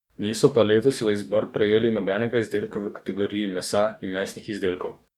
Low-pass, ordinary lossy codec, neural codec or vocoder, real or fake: 19.8 kHz; none; codec, 44.1 kHz, 2.6 kbps, DAC; fake